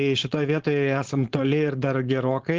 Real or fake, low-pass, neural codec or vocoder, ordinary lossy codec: fake; 7.2 kHz; codec, 16 kHz, 4.8 kbps, FACodec; Opus, 16 kbps